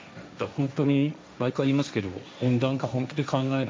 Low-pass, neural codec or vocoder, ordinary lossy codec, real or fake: none; codec, 16 kHz, 1.1 kbps, Voila-Tokenizer; none; fake